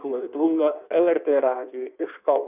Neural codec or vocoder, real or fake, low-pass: codec, 16 kHz in and 24 kHz out, 1.1 kbps, FireRedTTS-2 codec; fake; 3.6 kHz